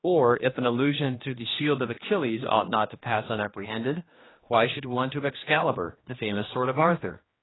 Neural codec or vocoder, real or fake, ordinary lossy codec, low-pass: codec, 16 kHz, 2 kbps, X-Codec, HuBERT features, trained on general audio; fake; AAC, 16 kbps; 7.2 kHz